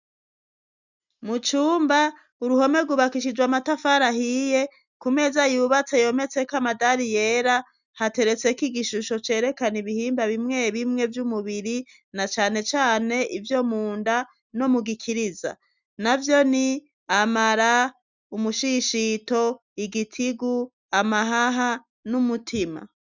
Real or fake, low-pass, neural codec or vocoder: real; 7.2 kHz; none